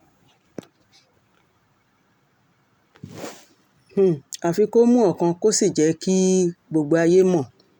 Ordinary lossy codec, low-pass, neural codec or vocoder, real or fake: none; 19.8 kHz; none; real